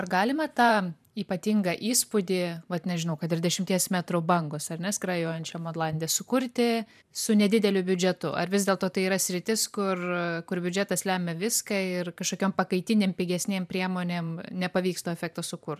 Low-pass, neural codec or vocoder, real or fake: 14.4 kHz; vocoder, 44.1 kHz, 128 mel bands every 512 samples, BigVGAN v2; fake